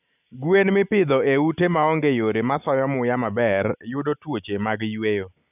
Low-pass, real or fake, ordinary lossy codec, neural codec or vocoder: 3.6 kHz; real; none; none